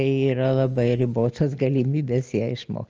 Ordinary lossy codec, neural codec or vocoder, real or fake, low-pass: Opus, 24 kbps; none; real; 7.2 kHz